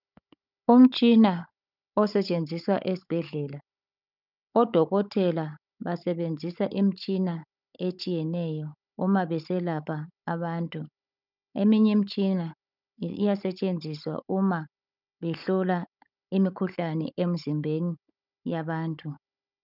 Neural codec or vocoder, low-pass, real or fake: codec, 16 kHz, 16 kbps, FunCodec, trained on Chinese and English, 50 frames a second; 5.4 kHz; fake